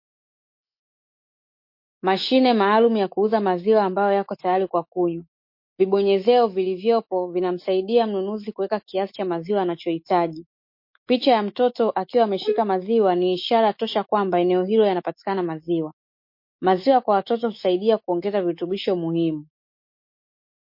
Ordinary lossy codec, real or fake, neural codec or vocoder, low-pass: MP3, 32 kbps; fake; autoencoder, 48 kHz, 128 numbers a frame, DAC-VAE, trained on Japanese speech; 5.4 kHz